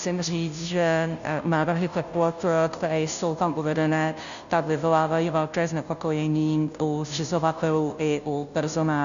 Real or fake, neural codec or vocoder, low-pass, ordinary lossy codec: fake; codec, 16 kHz, 0.5 kbps, FunCodec, trained on Chinese and English, 25 frames a second; 7.2 kHz; AAC, 96 kbps